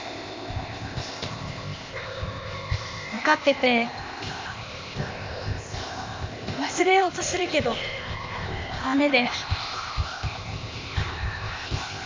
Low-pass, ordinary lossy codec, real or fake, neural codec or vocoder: 7.2 kHz; MP3, 64 kbps; fake; codec, 16 kHz, 0.8 kbps, ZipCodec